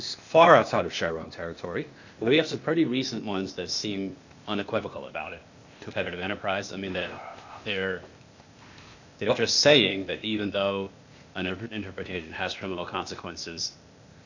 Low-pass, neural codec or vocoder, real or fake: 7.2 kHz; codec, 16 kHz, 0.8 kbps, ZipCodec; fake